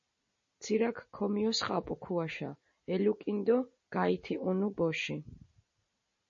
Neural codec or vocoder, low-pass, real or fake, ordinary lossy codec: none; 7.2 kHz; real; MP3, 32 kbps